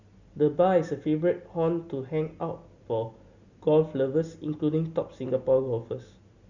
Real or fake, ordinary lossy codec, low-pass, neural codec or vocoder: real; Opus, 64 kbps; 7.2 kHz; none